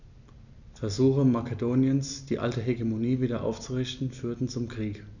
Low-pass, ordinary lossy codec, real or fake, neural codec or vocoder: 7.2 kHz; none; real; none